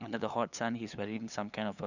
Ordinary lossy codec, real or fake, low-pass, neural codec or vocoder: none; fake; 7.2 kHz; codec, 16 kHz, 8 kbps, FunCodec, trained on LibriTTS, 25 frames a second